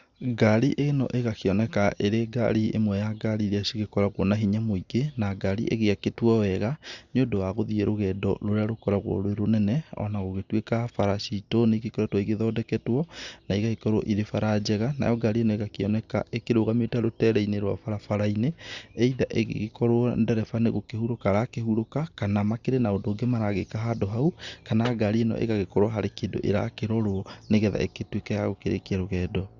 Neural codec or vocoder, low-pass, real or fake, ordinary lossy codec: none; 7.2 kHz; real; Opus, 64 kbps